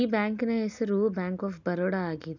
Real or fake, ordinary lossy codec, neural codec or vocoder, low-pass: real; none; none; 7.2 kHz